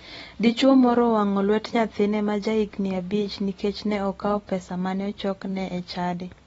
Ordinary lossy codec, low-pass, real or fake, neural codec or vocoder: AAC, 24 kbps; 19.8 kHz; fake; vocoder, 44.1 kHz, 128 mel bands every 256 samples, BigVGAN v2